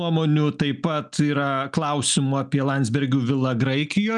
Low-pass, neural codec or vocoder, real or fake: 10.8 kHz; none; real